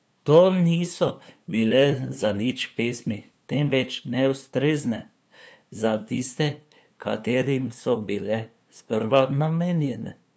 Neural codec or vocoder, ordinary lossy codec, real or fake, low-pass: codec, 16 kHz, 2 kbps, FunCodec, trained on LibriTTS, 25 frames a second; none; fake; none